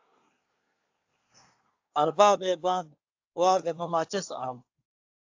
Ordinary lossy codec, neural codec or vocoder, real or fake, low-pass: AAC, 48 kbps; codec, 24 kHz, 1 kbps, SNAC; fake; 7.2 kHz